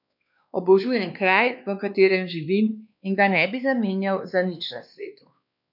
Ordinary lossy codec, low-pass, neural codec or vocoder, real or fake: none; 5.4 kHz; codec, 16 kHz, 2 kbps, X-Codec, WavLM features, trained on Multilingual LibriSpeech; fake